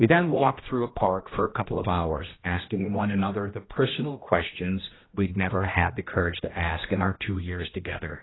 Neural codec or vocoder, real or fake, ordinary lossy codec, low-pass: codec, 16 kHz, 1 kbps, X-Codec, HuBERT features, trained on general audio; fake; AAC, 16 kbps; 7.2 kHz